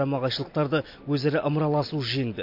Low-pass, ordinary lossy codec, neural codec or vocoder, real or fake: 5.4 kHz; MP3, 32 kbps; none; real